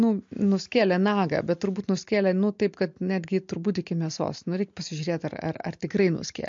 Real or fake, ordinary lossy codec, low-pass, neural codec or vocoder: real; MP3, 48 kbps; 7.2 kHz; none